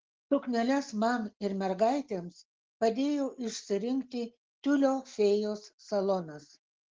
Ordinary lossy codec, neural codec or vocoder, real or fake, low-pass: Opus, 16 kbps; none; real; 7.2 kHz